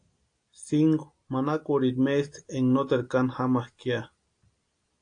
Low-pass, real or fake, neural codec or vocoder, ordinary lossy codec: 9.9 kHz; real; none; AAC, 48 kbps